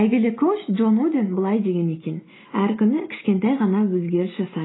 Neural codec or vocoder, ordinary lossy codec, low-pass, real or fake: none; AAC, 16 kbps; 7.2 kHz; real